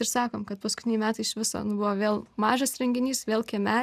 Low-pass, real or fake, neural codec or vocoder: 14.4 kHz; real; none